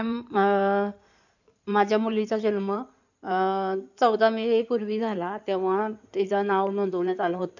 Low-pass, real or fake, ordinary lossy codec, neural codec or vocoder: 7.2 kHz; fake; none; codec, 16 kHz in and 24 kHz out, 2.2 kbps, FireRedTTS-2 codec